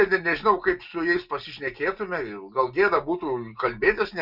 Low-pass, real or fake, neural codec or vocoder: 5.4 kHz; real; none